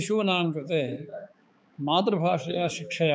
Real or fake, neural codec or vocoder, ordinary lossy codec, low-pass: fake; codec, 16 kHz, 4 kbps, X-Codec, HuBERT features, trained on balanced general audio; none; none